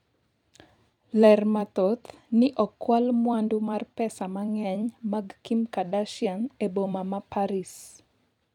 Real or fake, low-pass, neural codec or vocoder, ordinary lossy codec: fake; 19.8 kHz; vocoder, 44.1 kHz, 128 mel bands every 256 samples, BigVGAN v2; none